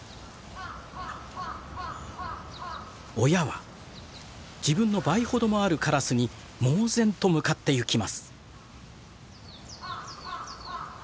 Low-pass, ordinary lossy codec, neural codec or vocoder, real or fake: none; none; none; real